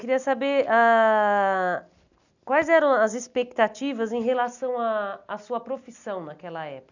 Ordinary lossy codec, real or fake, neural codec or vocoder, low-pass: none; real; none; 7.2 kHz